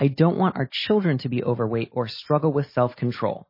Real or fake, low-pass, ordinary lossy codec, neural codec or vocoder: real; 5.4 kHz; MP3, 24 kbps; none